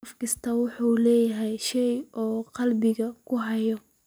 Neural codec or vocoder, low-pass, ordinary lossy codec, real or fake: none; none; none; real